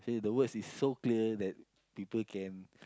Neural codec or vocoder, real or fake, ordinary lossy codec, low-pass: none; real; none; none